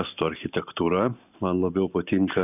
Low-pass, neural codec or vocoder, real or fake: 3.6 kHz; none; real